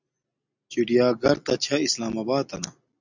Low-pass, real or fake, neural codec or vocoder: 7.2 kHz; real; none